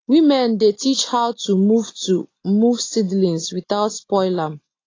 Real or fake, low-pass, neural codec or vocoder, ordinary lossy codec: real; 7.2 kHz; none; AAC, 32 kbps